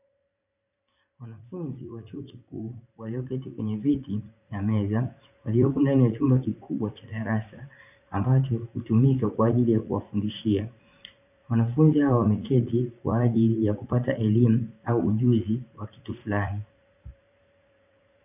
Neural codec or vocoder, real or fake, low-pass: vocoder, 44.1 kHz, 80 mel bands, Vocos; fake; 3.6 kHz